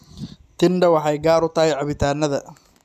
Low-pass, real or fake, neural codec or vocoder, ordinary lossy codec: 14.4 kHz; real; none; none